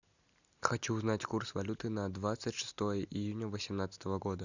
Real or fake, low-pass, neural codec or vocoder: real; 7.2 kHz; none